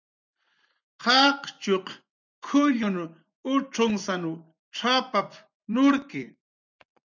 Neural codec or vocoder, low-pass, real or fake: vocoder, 24 kHz, 100 mel bands, Vocos; 7.2 kHz; fake